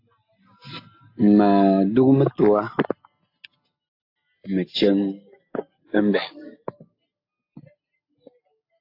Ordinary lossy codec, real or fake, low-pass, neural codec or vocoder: AAC, 32 kbps; real; 5.4 kHz; none